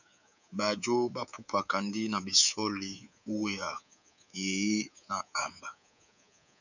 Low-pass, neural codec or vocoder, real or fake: 7.2 kHz; codec, 24 kHz, 3.1 kbps, DualCodec; fake